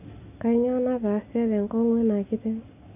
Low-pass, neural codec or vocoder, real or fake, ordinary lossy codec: 3.6 kHz; none; real; none